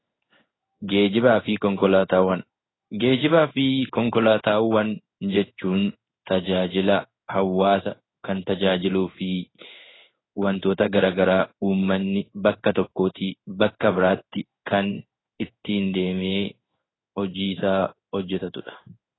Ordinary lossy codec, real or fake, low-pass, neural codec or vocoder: AAC, 16 kbps; fake; 7.2 kHz; codec, 16 kHz in and 24 kHz out, 1 kbps, XY-Tokenizer